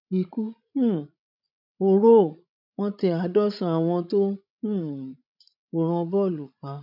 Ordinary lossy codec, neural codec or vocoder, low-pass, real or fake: none; codec, 16 kHz, 16 kbps, FreqCodec, larger model; 5.4 kHz; fake